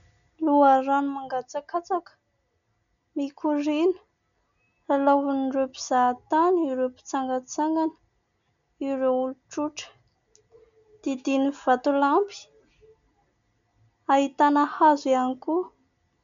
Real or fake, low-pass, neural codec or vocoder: real; 7.2 kHz; none